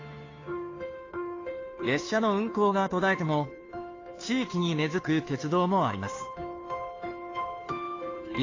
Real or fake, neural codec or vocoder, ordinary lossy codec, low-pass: fake; codec, 16 kHz, 2 kbps, FunCodec, trained on Chinese and English, 25 frames a second; AAC, 32 kbps; 7.2 kHz